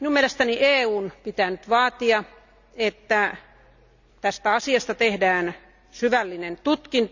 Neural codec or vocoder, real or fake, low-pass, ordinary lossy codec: none; real; 7.2 kHz; none